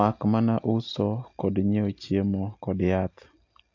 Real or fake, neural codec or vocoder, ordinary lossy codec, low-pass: real; none; AAC, 48 kbps; 7.2 kHz